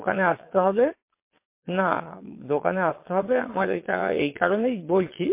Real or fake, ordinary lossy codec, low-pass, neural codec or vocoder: fake; MP3, 24 kbps; 3.6 kHz; vocoder, 22.05 kHz, 80 mel bands, Vocos